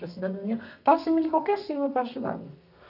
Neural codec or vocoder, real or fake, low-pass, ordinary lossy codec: codec, 32 kHz, 1.9 kbps, SNAC; fake; 5.4 kHz; none